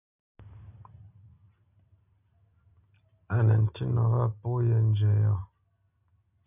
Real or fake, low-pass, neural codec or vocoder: real; 3.6 kHz; none